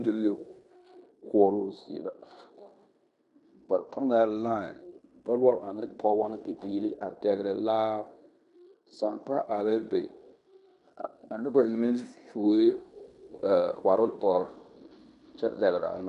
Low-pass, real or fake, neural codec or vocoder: 10.8 kHz; fake; codec, 16 kHz in and 24 kHz out, 0.9 kbps, LongCat-Audio-Codec, fine tuned four codebook decoder